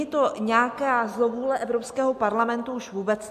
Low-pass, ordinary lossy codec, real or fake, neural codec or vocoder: 14.4 kHz; MP3, 64 kbps; real; none